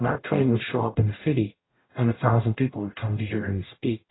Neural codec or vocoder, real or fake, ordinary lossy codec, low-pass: codec, 44.1 kHz, 0.9 kbps, DAC; fake; AAC, 16 kbps; 7.2 kHz